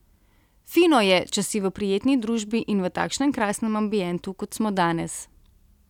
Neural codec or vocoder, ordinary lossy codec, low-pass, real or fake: none; none; 19.8 kHz; real